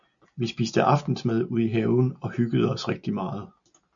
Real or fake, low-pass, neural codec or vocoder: real; 7.2 kHz; none